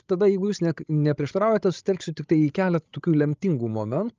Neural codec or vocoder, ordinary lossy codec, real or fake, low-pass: codec, 16 kHz, 16 kbps, FreqCodec, larger model; Opus, 24 kbps; fake; 7.2 kHz